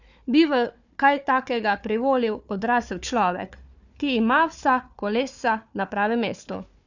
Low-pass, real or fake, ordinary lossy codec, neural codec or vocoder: 7.2 kHz; fake; none; codec, 16 kHz, 4 kbps, FunCodec, trained on Chinese and English, 50 frames a second